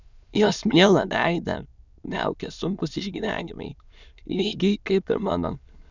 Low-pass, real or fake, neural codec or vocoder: 7.2 kHz; fake; autoencoder, 22.05 kHz, a latent of 192 numbers a frame, VITS, trained on many speakers